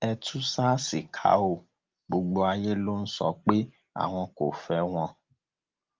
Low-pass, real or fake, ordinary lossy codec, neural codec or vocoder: 7.2 kHz; real; Opus, 24 kbps; none